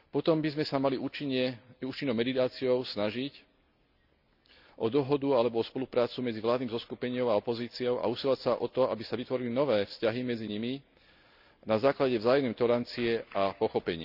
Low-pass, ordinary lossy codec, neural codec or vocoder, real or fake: 5.4 kHz; none; none; real